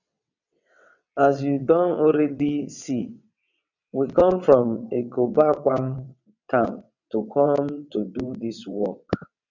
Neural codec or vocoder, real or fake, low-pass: vocoder, 22.05 kHz, 80 mel bands, WaveNeXt; fake; 7.2 kHz